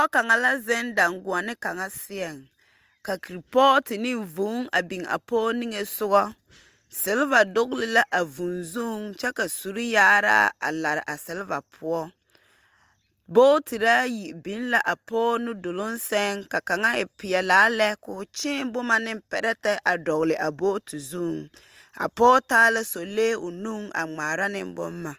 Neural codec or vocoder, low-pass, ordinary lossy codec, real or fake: none; 14.4 kHz; Opus, 32 kbps; real